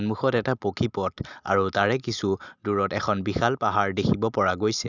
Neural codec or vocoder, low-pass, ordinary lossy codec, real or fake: none; 7.2 kHz; none; real